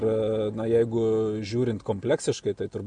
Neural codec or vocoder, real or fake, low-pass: none; real; 9.9 kHz